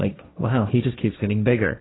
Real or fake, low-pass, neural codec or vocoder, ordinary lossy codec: fake; 7.2 kHz; codec, 16 kHz, 1.1 kbps, Voila-Tokenizer; AAC, 16 kbps